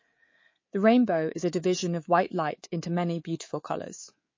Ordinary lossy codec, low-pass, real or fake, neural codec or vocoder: MP3, 32 kbps; 7.2 kHz; fake; codec, 24 kHz, 3.1 kbps, DualCodec